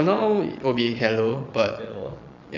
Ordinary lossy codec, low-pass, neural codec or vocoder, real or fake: none; 7.2 kHz; vocoder, 22.05 kHz, 80 mel bands, Vocos; fake